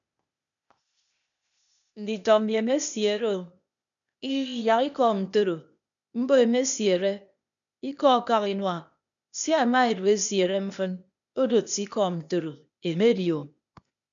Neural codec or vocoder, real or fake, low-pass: codec, 16 kHz, 0.8 kbps, ZipCodec; fake; 7.2 kHz